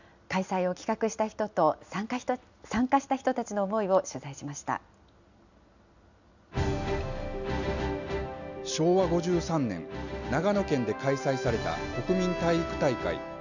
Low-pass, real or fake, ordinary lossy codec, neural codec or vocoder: 7.2 kHz; real; none; none